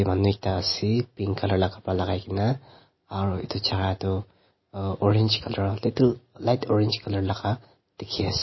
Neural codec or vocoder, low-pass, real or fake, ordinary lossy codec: none; 7.2 kHz; real; MP3, 24 kbps